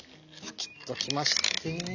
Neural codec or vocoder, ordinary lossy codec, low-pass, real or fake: none; none; 7.2 kHz; real